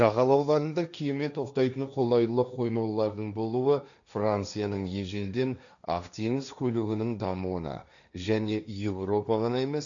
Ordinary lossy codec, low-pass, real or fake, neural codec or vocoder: none; 7.2 kHz; fake; codec, 16 kHz, 1.1 kbps, Voila-Tokenizer